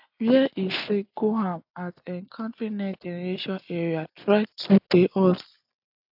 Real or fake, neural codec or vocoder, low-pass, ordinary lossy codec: real; none; 5.4 kHz; AAC, 48 kbps